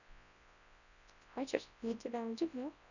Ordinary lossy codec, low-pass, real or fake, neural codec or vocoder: none; 7.2 kHz; fake; codec, 24 kHz, 0.9 kbps, WavTokenizer, large speech release